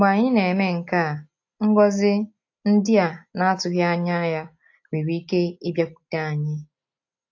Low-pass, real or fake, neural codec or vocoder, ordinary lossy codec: 7.2 kHz; real; none; AAC, 48 kbps